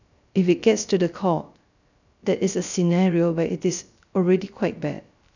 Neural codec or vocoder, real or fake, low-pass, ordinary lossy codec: codec, 16 kHz, 0.3 kbps, FocalCodec; fake; 7.2 kHz; none